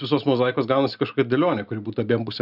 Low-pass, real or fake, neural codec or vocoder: 5.4 kHz; real; none